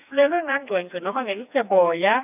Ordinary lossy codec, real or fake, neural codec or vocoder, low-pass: none; fake; codec, 16 kHz, 2 kbps, FreqCodec, smaller model; 3.6 kHz